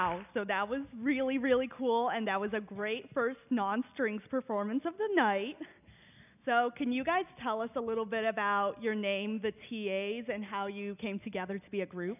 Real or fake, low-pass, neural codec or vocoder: real; 3.6 kHz; none